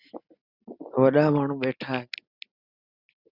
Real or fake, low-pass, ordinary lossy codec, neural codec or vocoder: real; 5.4 kHz; Opus, 64 kbps; none